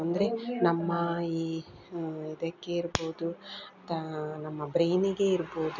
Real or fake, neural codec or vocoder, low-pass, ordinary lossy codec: real; none; 7.2 kHz; none